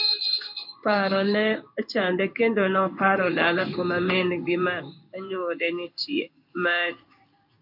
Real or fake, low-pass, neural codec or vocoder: fake; 5.4 kHz; codec, 16 kHz in and 24 kHz out, 1 kbps, XY-Tokenizer